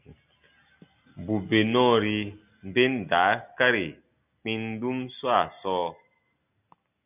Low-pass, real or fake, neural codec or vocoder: 3.6 kHz; real; none